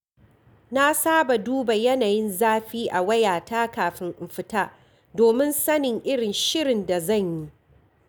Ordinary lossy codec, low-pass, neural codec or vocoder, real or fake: none; none; none; real